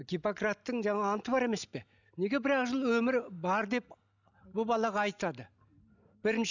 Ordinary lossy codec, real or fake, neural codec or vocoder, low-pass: none; real; none; 7.2 kHz